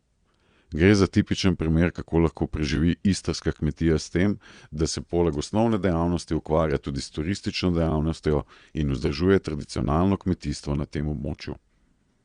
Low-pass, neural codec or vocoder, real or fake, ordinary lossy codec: 9.9 kHz; vocoder, 22.05 kHz, 80 mel bands, Vocos; fake; Opus, 64 kbps